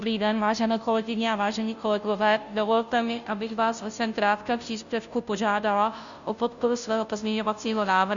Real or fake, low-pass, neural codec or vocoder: fake; 7.2 kHz; codec, 16 kHz, 0.5 kbps, FunCodec, trained on Chinese and English, 25 frames a second